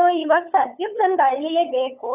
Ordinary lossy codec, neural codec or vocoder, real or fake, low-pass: none; codec, 16 kHz, 8 kbps, FunCodec, trained on LibriTTS, 25 frames a second; fake; 3.6 kHz